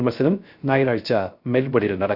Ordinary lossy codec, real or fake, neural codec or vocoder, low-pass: Opus, 64 kbps; fake; codec, 16 kHz, 0.3 kbps, FocalCodec; 5.4 kHz